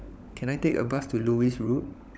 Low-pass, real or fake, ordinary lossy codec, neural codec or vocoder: none; fake; none; codec, 16 kHz, 16 kbps, FunCodec, trained on LibriTTS, 50 frames a second